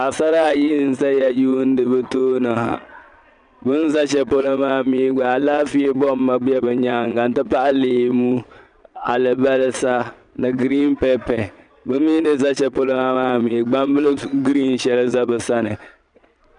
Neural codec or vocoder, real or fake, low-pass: vocoder, 22.05 kHz, 80 mel bands, Vocos; fake; 9.9 kHz